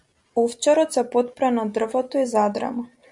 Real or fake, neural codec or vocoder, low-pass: real; none; 10.8 kHz